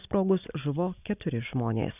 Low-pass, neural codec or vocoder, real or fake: 3.6 kHz; none; real